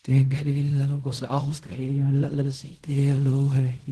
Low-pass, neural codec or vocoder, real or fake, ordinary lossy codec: 10.8 kHz; codec, 16 kHz in and 24 kHz out, 0.4 kbps, LongCat-Audio-Codec, fine tuned four codebook decoder; fake; Opus, 16 kbps